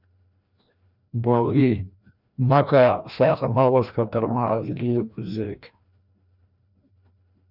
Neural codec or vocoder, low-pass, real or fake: codec, 16 kHz, 1 kbps, FreqCodec, larger model; 5.4 kHz; fake